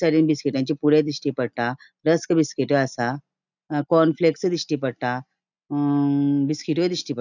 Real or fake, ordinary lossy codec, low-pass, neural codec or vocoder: real; none; 7.2 kHz; none